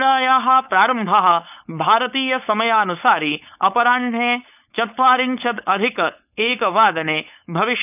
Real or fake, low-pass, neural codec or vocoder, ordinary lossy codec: fake; 3.6 kHz; codec, 16 kHz, 4.8 kbps, FACodec; none